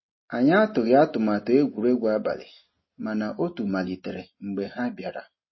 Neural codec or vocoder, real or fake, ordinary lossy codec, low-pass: none; real; MP3, 24 kbps; 7.2 kHz